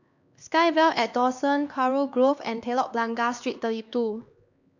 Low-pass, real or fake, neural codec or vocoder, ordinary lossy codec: 7.2 kHz; fake; codec, 16 kHz, 2 kbps, X-Codec, HuBERT features, trained on LibriSpeech; none